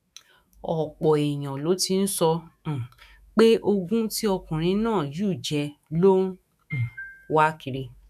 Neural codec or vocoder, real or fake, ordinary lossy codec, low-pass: autoencoder, 48 kHz, 128 numbers a frame, DAC-VAE, trained on Japanese speech; fake; none; 14.4 kHz